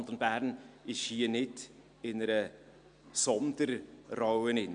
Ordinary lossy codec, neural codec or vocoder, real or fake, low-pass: MP3, 64 kbps; none; real; 9.9 kHz